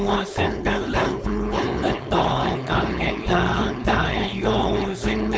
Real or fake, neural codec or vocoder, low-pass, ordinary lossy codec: fake; codec, 16 kHz, 4.8 kbps, FACodec; none; none